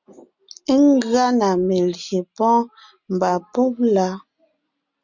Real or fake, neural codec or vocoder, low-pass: real; none; 7.2 kHz